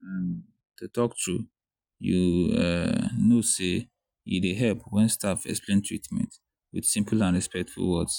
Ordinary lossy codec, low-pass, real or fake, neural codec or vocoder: none; 19.8 kHz; real; none